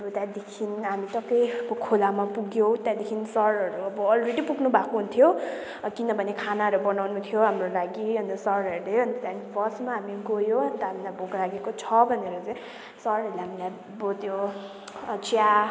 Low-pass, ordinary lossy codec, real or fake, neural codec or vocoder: none; none; real; none